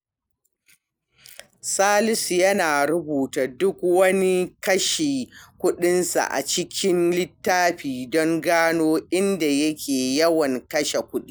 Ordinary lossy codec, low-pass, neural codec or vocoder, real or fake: none; none; none; real